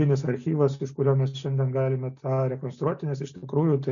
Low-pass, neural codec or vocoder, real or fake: 7.2 kHz; none; real